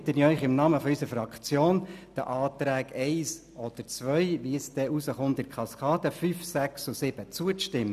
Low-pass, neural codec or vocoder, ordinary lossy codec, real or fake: 14.4 kHz; none; none; real